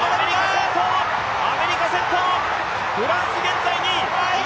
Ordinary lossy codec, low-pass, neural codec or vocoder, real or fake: none; none; none; real